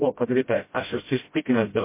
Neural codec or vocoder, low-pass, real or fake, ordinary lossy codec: codec, 16 kHz, 0.5 kbps, FreqCodec, smaller model; 3.6 kHz; fake; MP3, 24 kbps